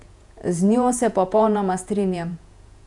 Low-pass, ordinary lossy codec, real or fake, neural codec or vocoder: 10.8 kHz; none; fake; vocoder, 48 kHz, 128 mel bands, Vocos